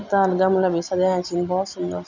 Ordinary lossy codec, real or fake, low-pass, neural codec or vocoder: none; real; 7.2 kHz; none